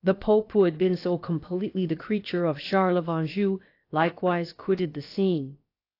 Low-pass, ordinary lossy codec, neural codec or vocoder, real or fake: 5.4 kHz; AAC, 32 kbps; codec, 16 kHz, about 1 kbps, DyCAST, with the encoder's durations; fake